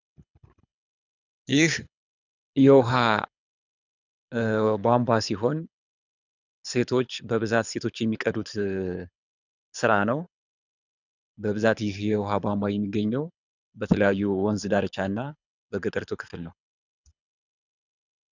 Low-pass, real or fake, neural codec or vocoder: 7.2 kHz; fake; codec, 24 kHz, 6 kbps, HILCodec